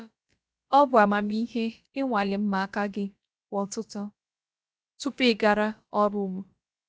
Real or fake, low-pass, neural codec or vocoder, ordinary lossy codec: fake; none; codec, 16 kHz, about 1 kbps, DyCAST, with the encoder's durations; none